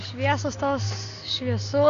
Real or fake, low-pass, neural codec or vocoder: real; 7.2 kHz; none